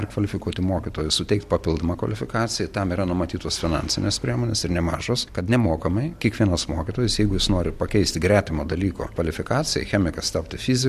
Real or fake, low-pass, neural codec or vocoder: real; 14.4 kHz; none